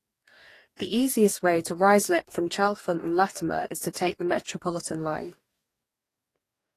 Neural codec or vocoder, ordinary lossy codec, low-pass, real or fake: codec, 44.1 kHz, 2.6 kbps, DAC; AAC, 48 kbps; 14.4 kHz; fake